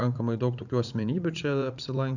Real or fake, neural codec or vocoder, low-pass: fake; vocoder, 44.1 kHz, 80 mel bands, Vocos; 7.2 kHz